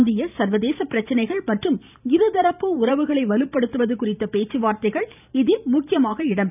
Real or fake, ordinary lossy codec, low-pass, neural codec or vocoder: fake; none; 3.6 kHz; vocoder, 44.1 kHz, 128 mel bands every 512 samples, BigVGAN v2